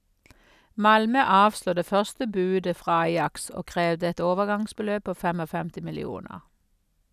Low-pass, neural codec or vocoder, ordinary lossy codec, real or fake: 14.4 kHz; none; none; real